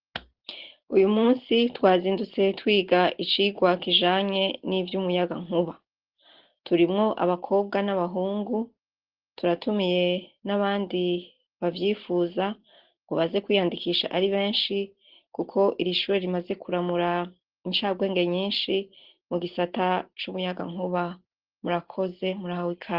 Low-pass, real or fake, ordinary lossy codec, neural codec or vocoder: 5.4 kHz; real; Opus, 16 kbps; none